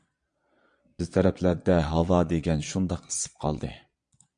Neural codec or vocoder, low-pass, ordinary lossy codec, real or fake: vocoder, 22.05 kHz, 80 mel bands, Vocos; 9.9 kHz; MP3, 64 kbps; fake